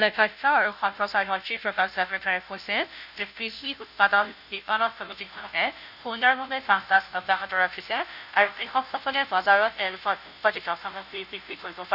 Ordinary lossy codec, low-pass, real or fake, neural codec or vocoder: none; 5.4 kHz; fake; codec, 16 kHz, 0.5 kbps, FunCodec, trained on Chinese and English, 25 frames a second